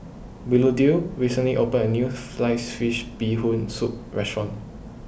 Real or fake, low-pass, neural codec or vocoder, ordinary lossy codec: real; none; none; none